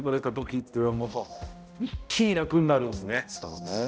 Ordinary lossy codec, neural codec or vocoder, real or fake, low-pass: none; codec, 16 kHz, 0.5 kbps, X-Codec, HuBERT features, trained on balanced general audio; fake; none